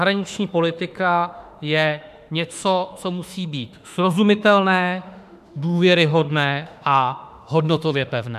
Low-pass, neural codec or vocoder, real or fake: 14.4 kHz; autoencoder, 48 kHz, 32 numbers a frame, DAC-VAE, trained on Japanese speech; fake